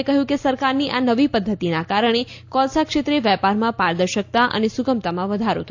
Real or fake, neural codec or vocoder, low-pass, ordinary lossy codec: real; none; 7.2 kHz; AAC, 48 kbps